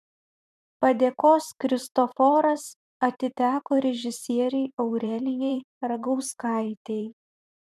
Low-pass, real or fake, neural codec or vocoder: 14.4 kHz; fake; vocoder, 44.1 kHz, 128 mel bands every 512 samples, BigVGAN v2